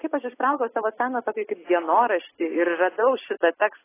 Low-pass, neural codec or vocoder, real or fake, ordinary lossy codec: 3.6 kHz; none; real; AAC, 16 kbps